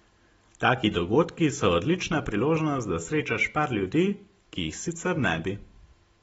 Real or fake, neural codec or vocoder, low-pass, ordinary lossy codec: real; none; 19.8 kHz; AAC, 24 kbps